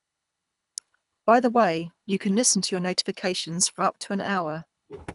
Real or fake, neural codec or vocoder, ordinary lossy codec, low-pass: fake; codec, 24 kHz, 3 kbps, HILCodec; none; 10.8 kHz